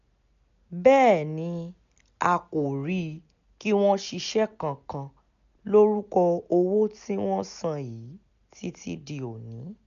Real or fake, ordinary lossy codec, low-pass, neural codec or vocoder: real; none; 7.2 kHz; none